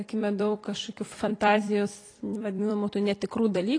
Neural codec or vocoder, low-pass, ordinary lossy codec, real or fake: vocoder, 44.1 kHz, 128 mel bands every 256 samples, BigVGAN v2; 9.9 kHz; AAC, 32 kbps; fake